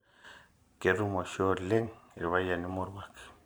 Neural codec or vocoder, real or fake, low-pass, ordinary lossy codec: vocoder, 44.1 kHz, 128 mel bands every 512 samples, BigVGAN v2; fake; none; none